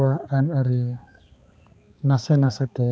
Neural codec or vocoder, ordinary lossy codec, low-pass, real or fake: codec, 16 kHz, 2 kbps, X-Codec, HuBERT features, trained on balanced general audio; none; none; fake